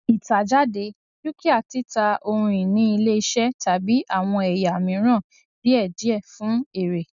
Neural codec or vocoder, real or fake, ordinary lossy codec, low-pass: none; real; none; 7.2 kHz